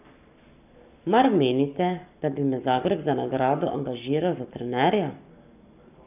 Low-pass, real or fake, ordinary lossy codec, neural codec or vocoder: 3.6 kHz; fake; none; codec, 44.1 kHz, 7.8 kbps, Pupu-Codec